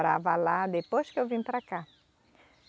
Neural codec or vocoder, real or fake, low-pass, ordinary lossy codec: none; real; none; none